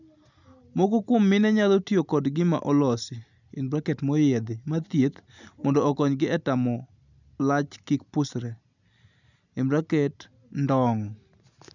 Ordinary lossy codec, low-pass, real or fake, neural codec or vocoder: none; 7.2 kHz; real; none